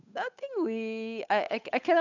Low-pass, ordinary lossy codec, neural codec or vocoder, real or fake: 7.2 kHz; none; codec, 16 kHz, 8 kbps, FunCodec, trained on Chinese and English, 25 frames a second; fake